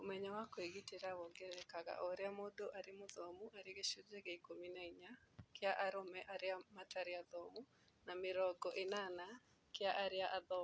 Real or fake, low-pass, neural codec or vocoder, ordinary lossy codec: real; none; none; none